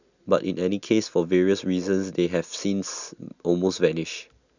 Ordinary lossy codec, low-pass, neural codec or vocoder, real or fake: none; 7.2 kHz; none; real